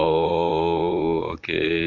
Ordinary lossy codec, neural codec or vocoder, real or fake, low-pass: none; vocoder, 44.1 kHz, 128 mel bands, Pupu-Vocoder; fake; 7.2 kHz